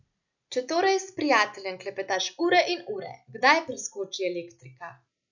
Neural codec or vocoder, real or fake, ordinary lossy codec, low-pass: none; real; none; 7.2 kHz